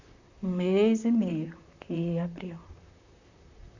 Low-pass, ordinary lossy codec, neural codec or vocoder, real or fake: 7.2 kHz; none; vocoder, 44.1 kHz, 128 mel bands, Pupu-Vocoder; fake